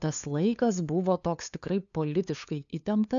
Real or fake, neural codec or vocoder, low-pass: fake; codec, 16 kHz, 2 kbps, FunCodec, trained on LibriTTS, 25 frames a second; 7.2 kHz